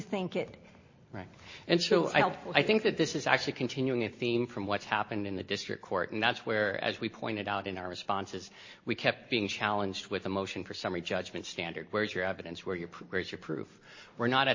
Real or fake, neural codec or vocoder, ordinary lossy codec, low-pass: real; none; MP3, 32 kbps; 7.2 kHz